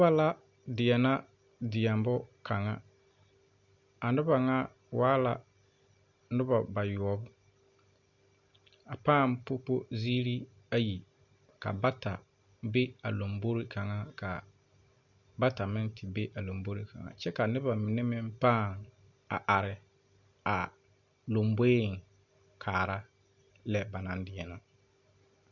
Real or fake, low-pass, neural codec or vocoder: real; 7.2 kHz; none